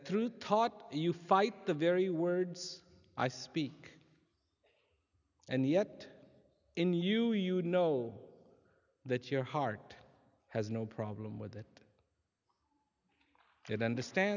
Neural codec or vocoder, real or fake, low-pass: none; real; 7.2 kHz